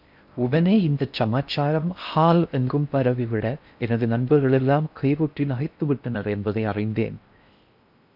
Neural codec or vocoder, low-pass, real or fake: codec, 16 kHz in and 24 kHz out, 0.6 kbps, FocalCodec, streaming, 4096 codes; 5.4 kHz; fake